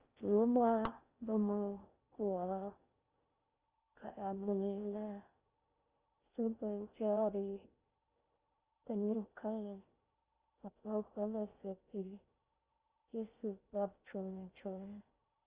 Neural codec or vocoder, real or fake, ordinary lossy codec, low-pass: codec, 16 kHz in and 24 kHz out, 0.6 kbps, FocalCodec, streaming, 4096 codes; fake; Opus, 24 kbps; 3.6 kHz